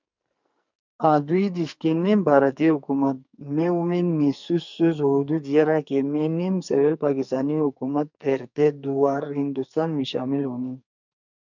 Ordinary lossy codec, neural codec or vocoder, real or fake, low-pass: MP3, 64 kbps; codec, 44.1 kHz, 2.6 kbps, SNAC; fake; 7.2 kHz